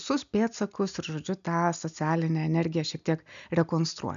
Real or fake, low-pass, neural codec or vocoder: real; 7.2 kHz; none